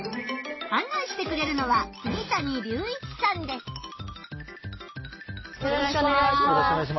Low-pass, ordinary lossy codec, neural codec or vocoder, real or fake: 7.2 kHz; MP3, 24 kbps; none; real